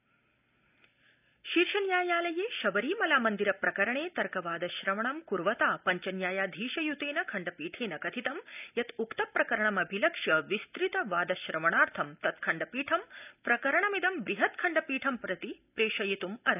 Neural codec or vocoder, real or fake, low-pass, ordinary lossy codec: none; real; 3.6 kHz; none